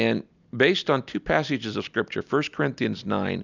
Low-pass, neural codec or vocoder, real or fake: 7.2 kHz; none; real